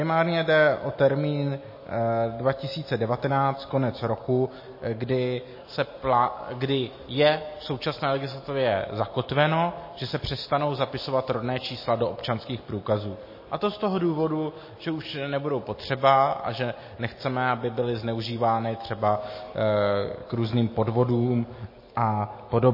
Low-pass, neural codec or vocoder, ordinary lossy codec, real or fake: 5.4 kHz; none; MP3, 24 kbps; real